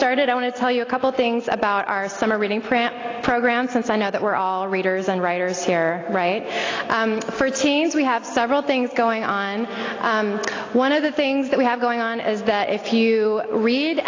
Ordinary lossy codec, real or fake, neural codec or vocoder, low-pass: AAC, 32 kbps; real; none; 7.2 kHz